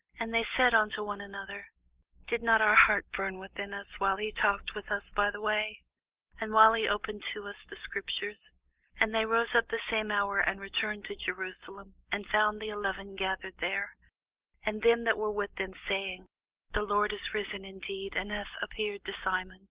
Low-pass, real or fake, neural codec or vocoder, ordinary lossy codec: 3.6 kHz; real; none; Opus, 16 kbps